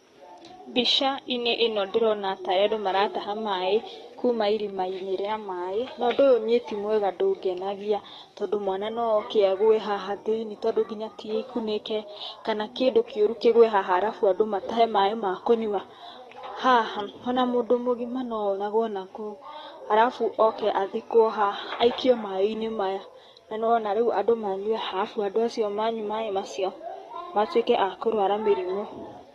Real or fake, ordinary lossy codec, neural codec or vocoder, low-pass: fake; AAC, 32 kbps; codec, 44.1 kHz, 7.8 kbps, DAC; 19.8 kHz